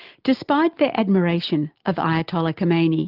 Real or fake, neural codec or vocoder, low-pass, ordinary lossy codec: real; none; 5.4 kHz; Opus, 24 kbps